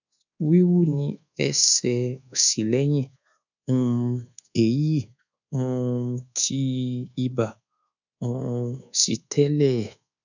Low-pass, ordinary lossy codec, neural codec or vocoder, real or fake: 7.2 kHz; none; codec, 24 kHz, 1.2 kbps, DualCodec; fake